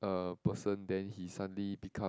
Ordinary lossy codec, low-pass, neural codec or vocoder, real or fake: none; none; none; real